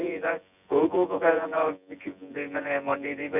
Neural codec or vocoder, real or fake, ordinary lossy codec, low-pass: vocoder, 24 kHz, 100 mel bands, Vocos; fake; none; 3.6 kHz